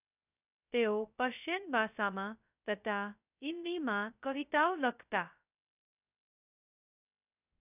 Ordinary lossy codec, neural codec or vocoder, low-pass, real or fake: none; codec, 16 kHz, 0.2 kbps, FocalCodec; 3.6 kHz; fake